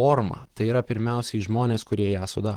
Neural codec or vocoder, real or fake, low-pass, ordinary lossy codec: vocoder, 44.1 kHz, 128 mel bands, Pupu-Vocoder; fake; 19.8 kHz; Opus, 16 kbps